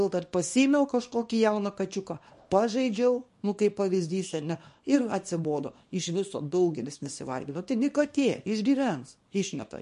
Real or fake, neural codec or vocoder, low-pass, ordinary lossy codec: fake; codec, 24 kHz, 0.9 kbps, WavTokenizer, small release; 10.8 kHz; MP3, 48 kbps